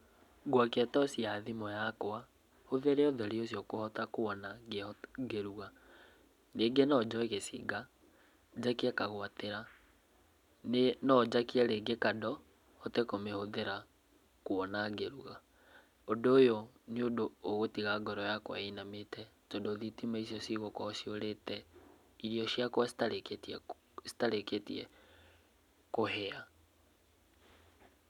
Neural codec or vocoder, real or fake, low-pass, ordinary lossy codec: none; real; 19.8 kHz; none